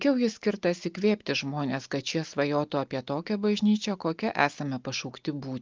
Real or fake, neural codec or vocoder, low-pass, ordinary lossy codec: real; none; 7.2 kHz; Opus, 24 kbps